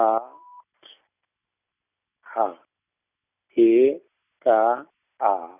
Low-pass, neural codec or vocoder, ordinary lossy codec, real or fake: 3.6 kHz; none; none; real